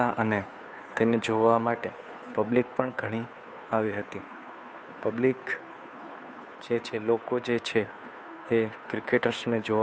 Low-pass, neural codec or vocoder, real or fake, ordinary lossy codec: none; codec, 16 kHz, 2 kbps, FunCodec, trained on Chinese and English, 25 frames a second; fake; none